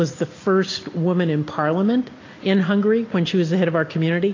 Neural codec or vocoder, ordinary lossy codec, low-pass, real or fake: none; AAC, 32 kbps; 7.2 kHz; real